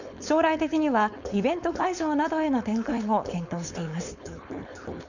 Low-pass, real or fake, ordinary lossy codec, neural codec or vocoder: 7.2 kHz; fake; none; codec, 16 kHz, 4.8 kbps, FACodec